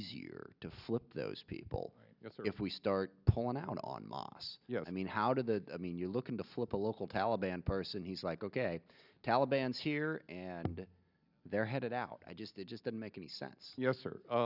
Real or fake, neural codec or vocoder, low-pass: real; none; 5.4 kHz